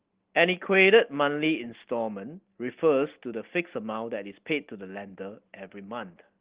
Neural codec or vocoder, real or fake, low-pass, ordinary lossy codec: none; real; 3.6 kHz; Opus, 16 kbps